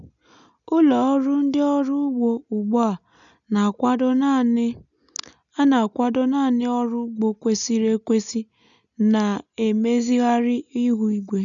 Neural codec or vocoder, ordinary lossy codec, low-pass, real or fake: none; none; 7.2 kHz; real